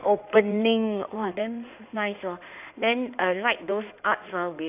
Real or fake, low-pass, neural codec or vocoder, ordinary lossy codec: fake; 3.6 kHz; codec, 16 kHz in and 24 kHz out, 2.2 kbps, FireRedTTS-2 codec; none